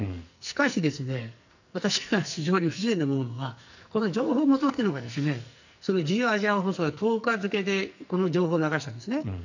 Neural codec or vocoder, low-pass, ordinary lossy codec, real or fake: codec, 44.1 kHz, 2.6 kbps, SNAC; 7.2 kHz; none; fake